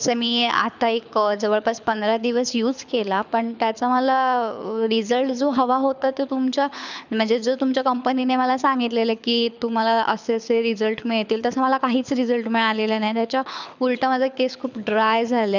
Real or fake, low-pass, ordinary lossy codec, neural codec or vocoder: fake; 7.2 kHz; none; codec, 24 kHz, 6 kbps, HILCodec